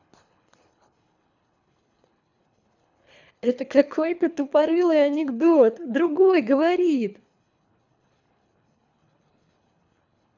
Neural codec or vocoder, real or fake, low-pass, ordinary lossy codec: codec, 24 kHz, 3 kbps, HILCodec; fake; 7.2 kHz; none